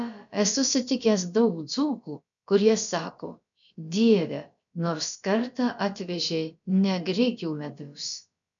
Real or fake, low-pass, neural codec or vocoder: fake; 7.2 kHz; codec, 16 kHz, about 1 kbps, DyCAST, with the encoder's durations